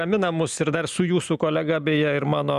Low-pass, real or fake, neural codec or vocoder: 14.4 kHz; real; none